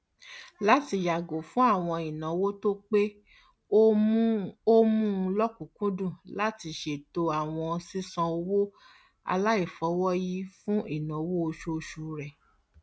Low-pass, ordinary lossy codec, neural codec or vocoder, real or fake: none; none; none; real